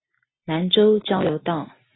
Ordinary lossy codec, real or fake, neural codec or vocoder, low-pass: AAC, 16 kbps; real; none; 7.2 kHz